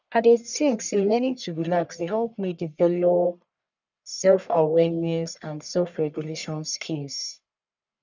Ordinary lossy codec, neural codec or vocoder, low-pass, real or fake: none; codec, 44.1 kHz, 1.7 kbps, Pupu-Codec; 7.2 kHz; fake